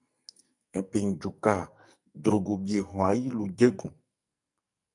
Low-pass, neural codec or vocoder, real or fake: 10.8 kHz; codec, 44.1 kHz, 2.6 kbps, SNAC; fake